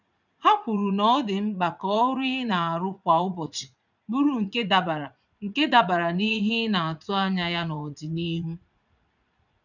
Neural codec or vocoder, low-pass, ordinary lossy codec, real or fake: vocoder, 44.1 kHz, 80 mel bands, Vocos; 7.2 kHz; none; fake